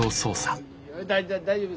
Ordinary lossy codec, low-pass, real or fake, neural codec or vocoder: none; none; real; none